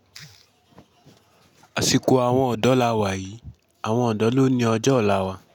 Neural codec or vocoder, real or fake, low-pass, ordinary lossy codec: none; real; 19.8 kHz; none